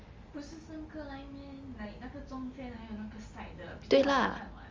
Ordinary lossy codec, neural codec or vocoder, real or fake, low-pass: Opus, 32 kbps; none; real; 7.2 kHz